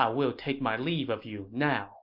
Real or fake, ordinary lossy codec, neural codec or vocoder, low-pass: real; MP3, 48 kbps; none; 5.4 kHz